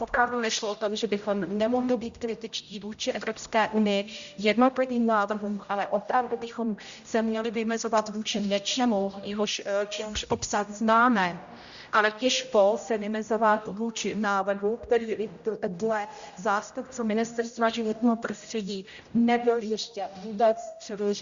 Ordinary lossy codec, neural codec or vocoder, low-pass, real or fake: Opus, 64 kbps; codec, 16 kHz, 0.5 kbps, X-Codec, HuBERT features, trained on general audio; 7.2 kHz; fake